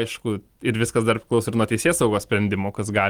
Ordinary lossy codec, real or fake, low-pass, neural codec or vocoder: Opus, 24 kbps; real; 19.8 kHz; none